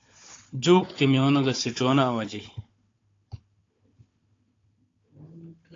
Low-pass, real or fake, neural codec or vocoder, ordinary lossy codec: 7.2 kHz; fake; codec, 16 kHz, 4 kbps, FunCodec, trained on Chinese and English, 50 frames a second; AAC, 32 kbps